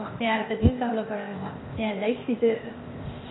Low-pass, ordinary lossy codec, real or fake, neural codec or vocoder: 7.2 kHz; AAC, 16 kbps; fake; codec, 16 kHz, 0.8 kbps, ZipCodec